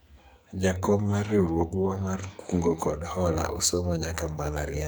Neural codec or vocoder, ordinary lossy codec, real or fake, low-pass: codec, 44.1 kHz, 2.6 kbps, SNAC; none; fake; none